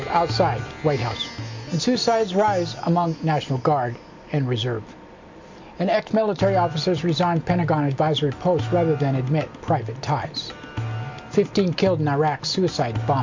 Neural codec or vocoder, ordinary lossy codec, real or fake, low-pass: none; MP3, 48 kbps; real; 7.2 kHz